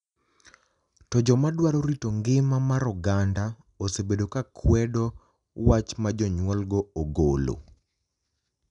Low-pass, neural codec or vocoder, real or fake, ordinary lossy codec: 10.8 kHz; none; real; none